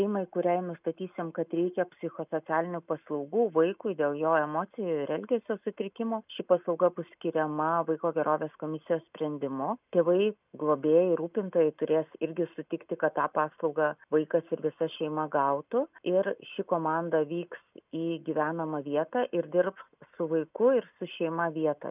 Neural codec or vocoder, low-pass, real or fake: none; 3.6 kHz; real